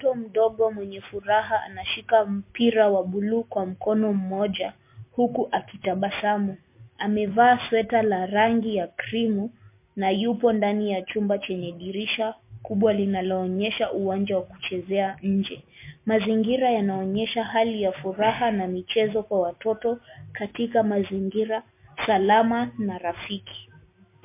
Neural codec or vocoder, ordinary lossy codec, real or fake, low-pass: none; MP3, 24 kbps; real; 3.6 kHz